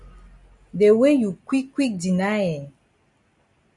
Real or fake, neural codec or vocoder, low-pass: real; none; 10.8 kHz